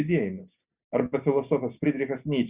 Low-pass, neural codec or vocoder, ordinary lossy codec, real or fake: 3.6 kHz; none; Opus, 64 kbps; real